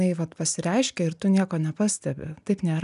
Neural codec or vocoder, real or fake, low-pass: none; real; 10.8 kHz